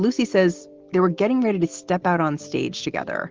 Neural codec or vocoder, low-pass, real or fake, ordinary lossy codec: none; 7.2 kHz; real; Opus, 16 kbps